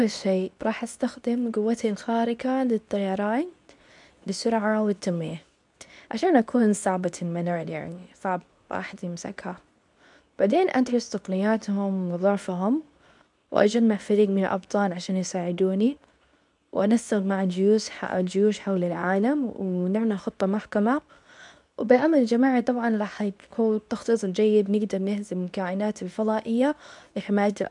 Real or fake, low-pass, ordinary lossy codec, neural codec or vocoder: fake; 10.8 kHz; none; codec, 24 kHz, 0.9 kbps, WavTokenizer, medium speech release version 2